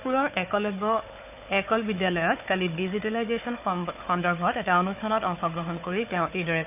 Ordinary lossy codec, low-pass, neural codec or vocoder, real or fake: none; 3.6 kHz; codec, 16 kHz, 4 kbps, FunCodec, trained on Chinese and English, 50 frames a second; fake